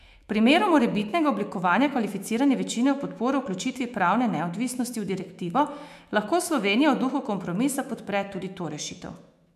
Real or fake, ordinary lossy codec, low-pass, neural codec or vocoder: fake; MP3, 96 kbps; 14.4 kHz; autoencoder, 48 kHz, 128 numbers a frame, DAC-VAE, trained on Japanese speech